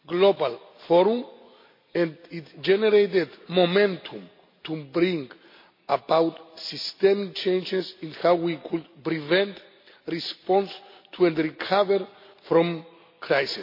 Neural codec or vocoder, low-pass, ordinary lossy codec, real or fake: none; 5.4 kHz; MP3, 32 kbps; real